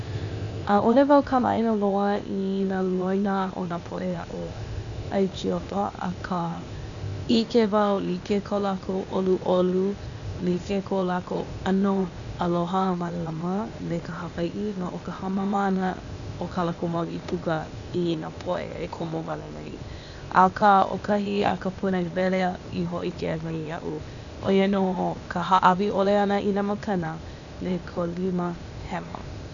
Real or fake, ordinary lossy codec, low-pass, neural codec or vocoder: fake; none; 7.2 kHz; codec, 16 kHz, 0.8 kbps, ZipCodec